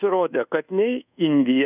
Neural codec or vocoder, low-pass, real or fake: codec, 24 kHz, 1.2 kbps, DualCodec; 3.6 kHz; fake